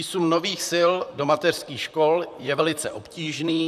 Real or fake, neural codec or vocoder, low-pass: fake; vocoder, 44.1 kHz, 128 mel bands, Pupu-Vocoder; 14.4 kHz